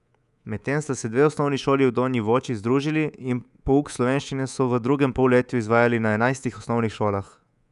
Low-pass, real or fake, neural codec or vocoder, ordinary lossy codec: 10.8 kHz; fake; codec, 24 kHz, 3.1 kbps, DualCodec; none